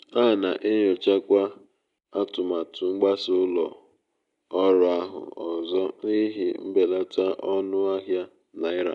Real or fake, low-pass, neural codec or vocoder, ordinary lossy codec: real; 10.8 kHz; none; none